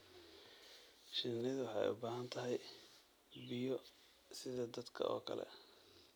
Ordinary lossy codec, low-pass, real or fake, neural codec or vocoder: none; none; real; none